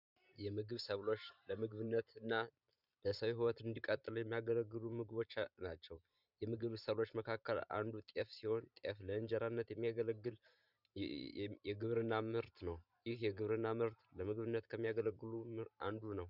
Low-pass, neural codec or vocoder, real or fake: 5.4 kHz; vocoder, 44.1 kHz, 128 mel bands every 512 samples, BigVGAN v2; fake